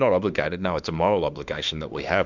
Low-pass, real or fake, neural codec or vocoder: 7.2 kHz; fake; autoencoder, 48 kHz, 32 numbers a frame, DAC-VAE, trained on Japanese speech